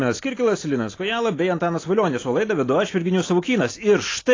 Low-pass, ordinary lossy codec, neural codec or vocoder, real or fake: 7.2 kHz; AAC, 32 kbps; none; real